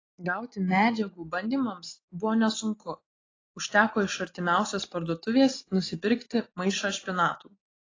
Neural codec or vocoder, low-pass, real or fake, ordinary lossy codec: none; 7.2 kHz; real; AAC, 32 kbps